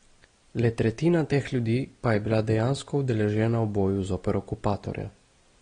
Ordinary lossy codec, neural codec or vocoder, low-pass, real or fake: AAC, 32 kbps; none; 9.9 kHz; real